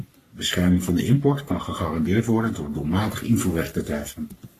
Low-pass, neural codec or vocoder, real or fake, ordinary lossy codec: 14.4 kHz; codec, 44.1 kHz, 3.4 kbps, Pupu-Codec; fake; AAC, 48 kbps